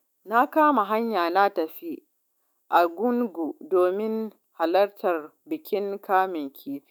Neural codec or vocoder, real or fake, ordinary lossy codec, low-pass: autoencoder, 48 kHz, 128 numbers a frame, DAC-VAE, trained on Japanese speech; fake; none; none